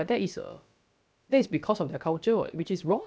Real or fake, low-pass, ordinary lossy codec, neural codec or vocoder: fake; none; none; codec, 16 kHz, about 1 kbps, DyCAST, with the encoder's durations